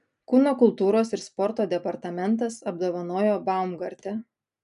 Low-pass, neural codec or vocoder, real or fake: 10.8 kHz; none; real